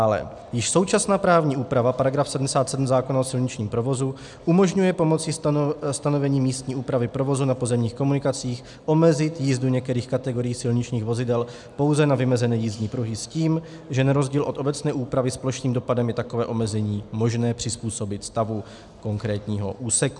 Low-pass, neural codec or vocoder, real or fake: 10.8 kHz; none; real